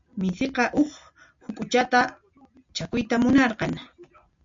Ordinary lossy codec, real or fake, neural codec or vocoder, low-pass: MP3, 48 kbps; real; none; 7.2 kHz